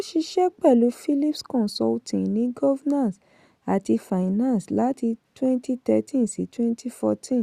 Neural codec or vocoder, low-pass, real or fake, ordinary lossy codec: none; 14.4 kHz; real; Opus, 64 kbps